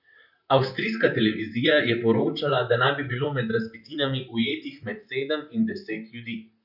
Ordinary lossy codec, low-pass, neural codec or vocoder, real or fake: none; 5.4 kHz; vocoder, 44.1 kHz, 128 mel bands, Pupu-Vocoder; fake